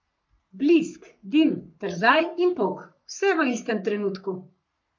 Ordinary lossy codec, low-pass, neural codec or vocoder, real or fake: MP3, 48 kbps; 7.2 kHz; codec, 44.1 kHz, 3.4 kbps, Pupu-Codec; fake